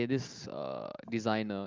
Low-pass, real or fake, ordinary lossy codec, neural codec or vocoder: 7.2 kHz; real; Opus, 32 kbps; none